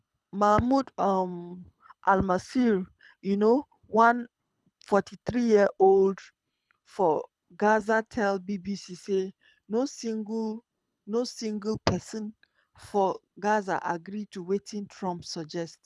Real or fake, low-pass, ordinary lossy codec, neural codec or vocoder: fake; none; none; codec, 24 kHz, 6 kbps, HILCodec